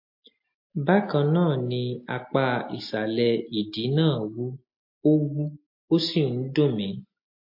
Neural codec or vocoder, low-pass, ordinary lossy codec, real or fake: none; 5.4 kHz; MP3, 32 kbps; real